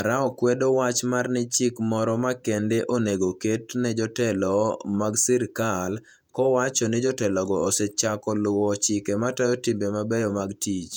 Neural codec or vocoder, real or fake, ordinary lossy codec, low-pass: vocoder, 48 kHz, 128 mel bands, Vocos; fake; none; 19.8 kHz